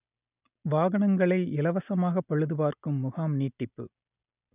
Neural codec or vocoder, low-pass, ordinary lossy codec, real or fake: none; 3.6 kHz; none; real